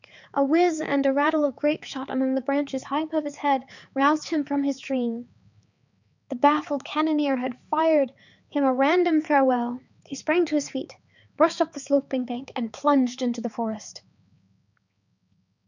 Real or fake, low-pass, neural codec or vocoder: fake; 7.2 kHz; codec, 16 kHz, 4 kbps, X-Codec, HuBERT features, trained on balanced general audio